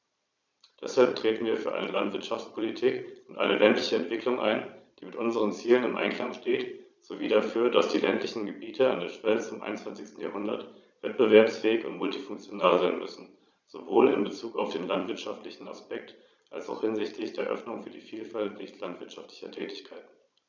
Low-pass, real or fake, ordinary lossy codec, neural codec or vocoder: 7.2 kHz; fake; none; vocoder, 22.05 kHz, 80 mel bands, Vocos